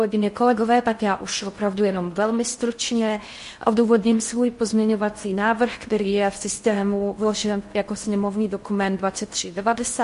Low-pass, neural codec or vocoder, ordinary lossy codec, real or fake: 10.8 kHz; codec, 16 kHz in and 24 kHz out, 0.6 kbps, FocalCodec, streaming, 4096 codes; MP3, 48 kbps; fake